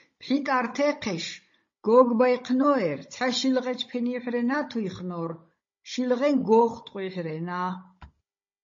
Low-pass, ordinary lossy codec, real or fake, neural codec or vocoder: 7.2 kHz; MP3, 32 kbps; fake; codec, 16 kHz, 16 kbps, FunCodec, trained on Chinese and English, 50 frames a second